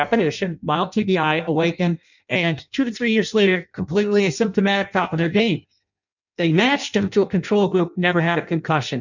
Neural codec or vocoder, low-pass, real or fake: codec, 16 kHz in and 24 kHz out, 0.6 kbps, FireRedTTS-2 codec; 7.2 kHz; fake